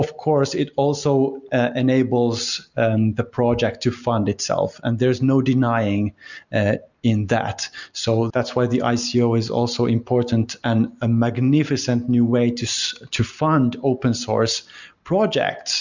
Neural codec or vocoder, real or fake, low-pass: none; real; 7.2 kHz